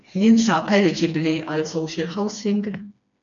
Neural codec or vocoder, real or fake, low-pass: codec, 16 kHz, 2 kbps, FreqCodec, smaller model; fake; 7.2 kHz